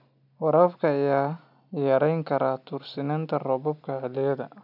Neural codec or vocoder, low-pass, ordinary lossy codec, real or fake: none; 5.4 kHz; none; real